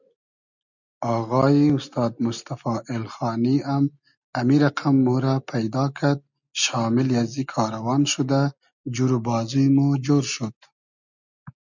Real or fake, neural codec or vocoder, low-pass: real; none; 7.2 kHz